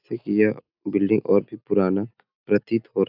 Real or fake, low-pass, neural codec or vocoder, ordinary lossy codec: real; 5.4 kHz; none; AAC, 48 kbps